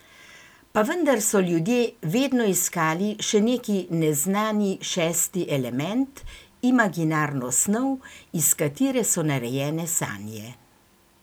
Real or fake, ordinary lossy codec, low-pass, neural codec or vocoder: real; none; none; none